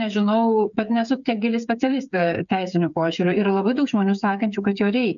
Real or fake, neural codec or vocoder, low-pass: fake; codec, 16 kHz, 8 kbps, FreqCodec, smaller model; 7.2 kHz